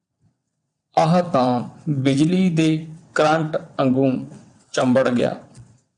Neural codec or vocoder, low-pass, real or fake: vocoder, 22.05 kHz, 80 mel bands, WaveNeXt; 9.9 kHz; fake